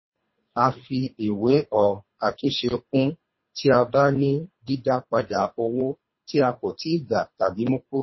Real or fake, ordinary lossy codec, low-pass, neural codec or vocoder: fake; MP3, 24 kbps; 7.2 kHz; codec, 24 kHz, 3 kbps, HILCodec